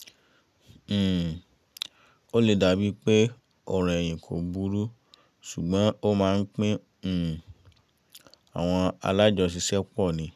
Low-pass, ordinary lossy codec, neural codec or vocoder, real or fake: 14.4 kHz; none; none; real